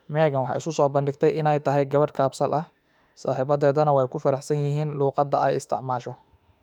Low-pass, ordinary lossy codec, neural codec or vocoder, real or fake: 19.8 kHz; none; autoencoder, 48 kHz, 32 numbers a frame, DAC-VAE, trained on Japanese speech; fake